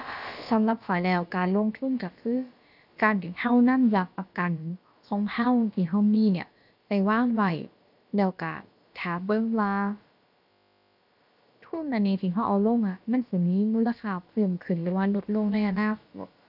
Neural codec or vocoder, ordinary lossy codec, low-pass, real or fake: codec, 16 kHz, about 1 kbps, DyCAST, with the encoder's durations; none; 5.4 kHz; fake